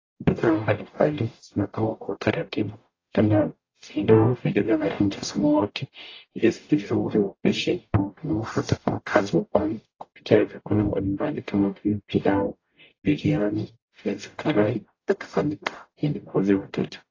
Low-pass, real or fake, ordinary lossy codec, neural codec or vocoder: 7.2 kHz; fake; AAC, 32 kbps; codec, 44.1 kHz, 0.9 kbps, DAC